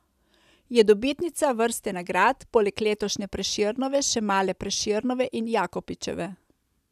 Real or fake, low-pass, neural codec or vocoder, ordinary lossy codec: real; 14.4 kHz; none; none